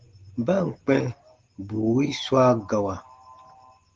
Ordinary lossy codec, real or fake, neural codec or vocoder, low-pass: Opus, 16 kbps; real; none; 7.2 kHz